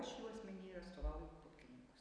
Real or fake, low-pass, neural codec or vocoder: real; 9.9 kHz; none